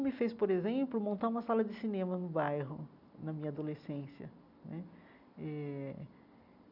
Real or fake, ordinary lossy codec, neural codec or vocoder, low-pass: real; none; none; 5.4 kHz